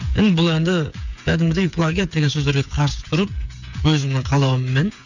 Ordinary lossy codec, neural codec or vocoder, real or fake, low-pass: none; codec, 16 kHz, 8 kbps, FreqCodec, smaller model; fake; 7.2 kHz